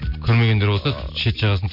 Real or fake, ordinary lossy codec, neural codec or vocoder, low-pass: real; none; none; 5.4 kHz